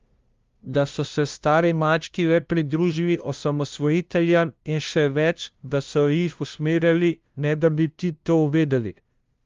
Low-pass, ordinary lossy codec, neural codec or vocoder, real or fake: 7.2 kHz; Opus, 32 kbps; codec, 16 kHz, 0.5 kbps, FunCodec, trained on LibriTTS, 25 frames a second; fake